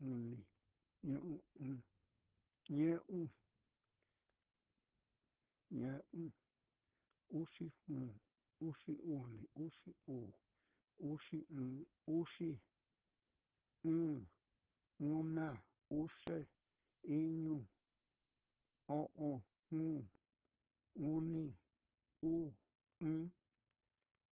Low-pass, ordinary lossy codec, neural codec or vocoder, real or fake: 3.6 kHz; Opus, 16 kbps; codec, 16 kHz, 4.8 kbps, FACodec; fake